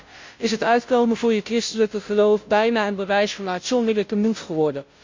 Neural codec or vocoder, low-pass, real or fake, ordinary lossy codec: codec, 16 kHz, 0.5 kbps, FunCodec, trained on Chinese and English, 25 frames a second; 7.2 kHz; fake; MP3, 48 kbps